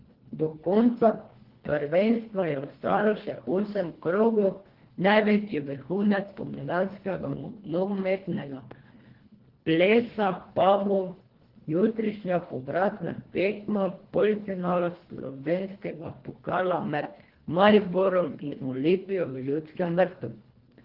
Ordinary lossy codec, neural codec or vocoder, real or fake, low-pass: Opus, 16 kbps; codec, 24 kHz, 1.5 kbps, HILCodec; fake; 5.4 kHz